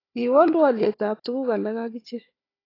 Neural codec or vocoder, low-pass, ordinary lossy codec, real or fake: codec, 16 kHz, 4 kbps, FunCodec, trained on Chinese and English, 50 frames a second; 5.4 kHz; AAC, 24 kbps; fake